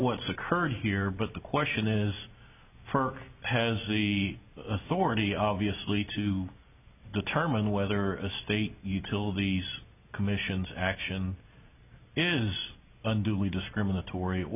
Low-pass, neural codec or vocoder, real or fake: 3.6 kHz; none; real